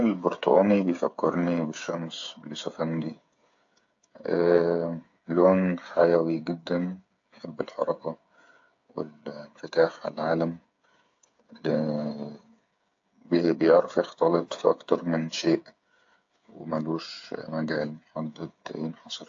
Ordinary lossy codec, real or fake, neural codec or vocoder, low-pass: AAC, 48 kbps; fake; codec, 16 kHz, 8 kbps, FreqCodec, smaller model; 7.2 kHz